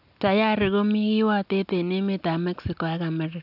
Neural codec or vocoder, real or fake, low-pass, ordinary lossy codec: none; real; 5.4 kHz; AAC, 48 kbps